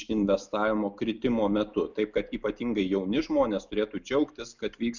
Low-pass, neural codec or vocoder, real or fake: 7.2 kHz; none; real